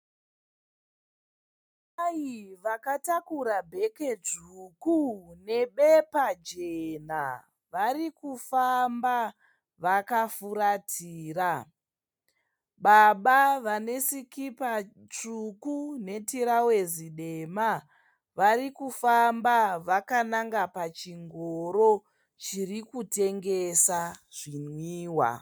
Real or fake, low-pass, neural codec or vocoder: real; 19.8 kHz; none